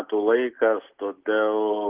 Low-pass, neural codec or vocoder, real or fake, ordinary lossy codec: 3.6 kHz; none; real; Opus, 16 kbps